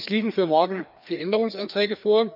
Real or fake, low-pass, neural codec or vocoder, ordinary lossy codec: fake; 5.4 kHz; codec, 16 kHz, 2 kbps, FreqCodec, larger model; none